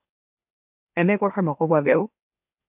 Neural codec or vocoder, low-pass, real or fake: autoencoder, 44.1 kHz, a latent of 192 numbers a frame, MeloTTS; 3.6 kHz; fake